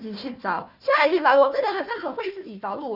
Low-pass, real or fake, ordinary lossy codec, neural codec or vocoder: 5.4 kHz; fake; Opus, 64 kbps; codec, 16 kHz, 1 kbps, FunCodec, trained on Chinese and English, 50 frames a second